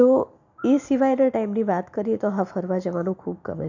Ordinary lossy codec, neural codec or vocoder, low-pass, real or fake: none; none; 7.2 kHz; real